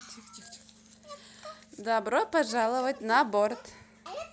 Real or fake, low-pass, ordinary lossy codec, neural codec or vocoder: real; none; none; none